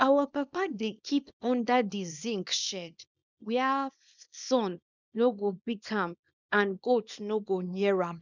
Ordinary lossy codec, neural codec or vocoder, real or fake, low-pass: none; codec, 24 kHz, 0.9 kbps, WavTokenizer, small release; fake; 7.2 kHz